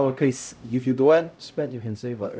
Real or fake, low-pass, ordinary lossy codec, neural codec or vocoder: fake; none; none; codec, 16 kHz, 0.5 kbps, X-Codec, HuBERT features, trained on LibriSpeech